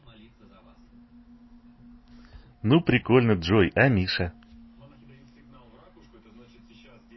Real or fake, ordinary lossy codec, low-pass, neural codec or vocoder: real; MP3, 24 kbps; 7.2 kHz; none